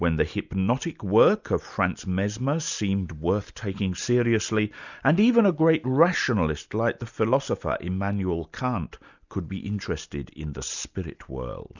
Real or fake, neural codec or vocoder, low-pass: real; none; 7.2 kHz